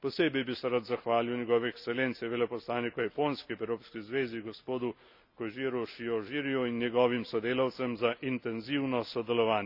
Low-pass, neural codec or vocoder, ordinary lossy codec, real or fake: 5.4 kHz; none; none; real